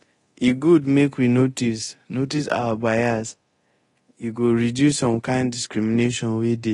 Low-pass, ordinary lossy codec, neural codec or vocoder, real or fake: 10.8 kHz; AAC, 32 kbps; codec, 24 kHz, 0.9 kbps, DualCodec; fake